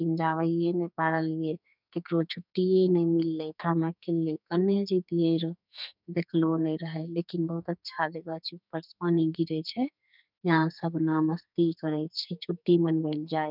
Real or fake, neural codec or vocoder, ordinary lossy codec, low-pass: fake; codec, 44.1 kHz, 2.6 kbps, SNAC; none; 5.4 kHz